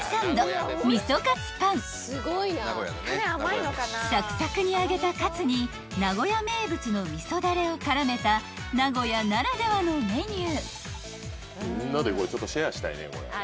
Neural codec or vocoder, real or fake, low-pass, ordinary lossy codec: none; real; none; none